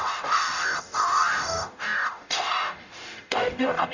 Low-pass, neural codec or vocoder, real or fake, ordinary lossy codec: 7.2 kHz; codec, 44.1 kHz, 0.9 kbps, DAC; fake; none